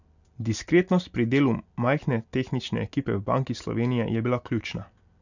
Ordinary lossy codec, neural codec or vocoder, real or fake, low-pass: none; none; real; 7.2 kHz